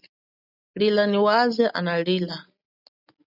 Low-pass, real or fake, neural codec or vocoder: 5.4 kHz; real; none